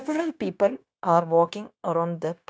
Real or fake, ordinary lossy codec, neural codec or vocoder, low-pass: fake; none; codec, 16 kHz, 0.9 kbps, LongCat-Audio-Codec; none